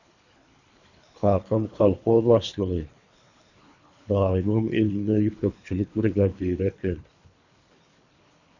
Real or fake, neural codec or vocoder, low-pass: fake; codec, 24 kHz, 3 kbps, HILCodec; 7.2 kHz